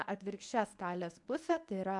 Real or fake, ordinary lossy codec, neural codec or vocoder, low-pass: fake; MP3, 64 kbps; codec, 24 kHz, 0.9 kbps, WavTokenizer, medium speech release version 1; 10.8 kHz